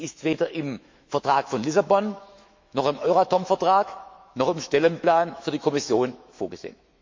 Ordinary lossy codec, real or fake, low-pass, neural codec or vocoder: MP3, 48 kbps; fake; 7.2 kHz; autoencoder, 48 kHz, 128 numbers a frame, DAC-VAE, trained on Japanese speech